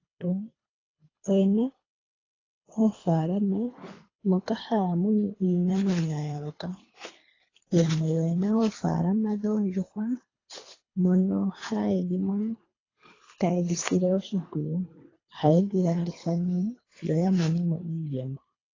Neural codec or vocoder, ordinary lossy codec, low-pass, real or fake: codec, 24 kHz, 3 kbps, HILCodec; AAC, 32 kbps; 7.2 kHz; fake